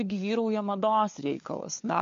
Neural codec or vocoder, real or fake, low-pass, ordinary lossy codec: codec, 16 kHz, 4 kbps, FunCodec, trained on LibriTTS, 50 frames a second; fake; 7.2 kHz; MP3, 48 kbps